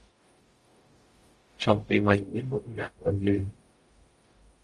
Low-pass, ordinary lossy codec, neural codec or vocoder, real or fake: 10.8 kHz; Opus, 32 kbps; codec, 44.1 kHz, 0.9 kbps, DAC; fake